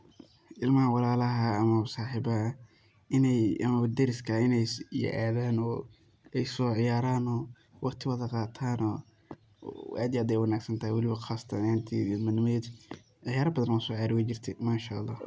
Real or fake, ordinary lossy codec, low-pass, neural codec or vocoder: real; none; none; none